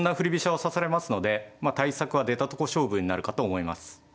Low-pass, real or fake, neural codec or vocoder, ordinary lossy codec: none; real; none; none